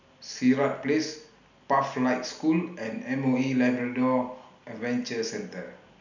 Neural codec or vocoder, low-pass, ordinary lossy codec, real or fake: none; 7.2 kHz; none; real